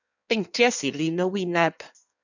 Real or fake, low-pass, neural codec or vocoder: fake; 7.2 kHz; codec, 16 kHz in and 24 kHz out, 1.1 kbps, FireRedTTS-2 codec